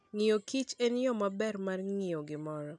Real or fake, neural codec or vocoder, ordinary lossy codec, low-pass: real; none; none; 10.8 kHz